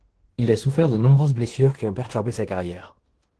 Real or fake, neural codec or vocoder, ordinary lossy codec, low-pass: fake; codec, 16 kHz in and 24 kHz out, 0.9 kbps, LongCat-Audio-Codec, fine tuned four codebook decoder; Opus, 16 kbps; 10.8 kHz